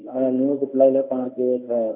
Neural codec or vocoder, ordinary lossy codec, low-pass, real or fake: codec, 24 kHz, 0.9 kbps, WavTokenizer, medium speech release version 1; none; 3.6 kHz; fake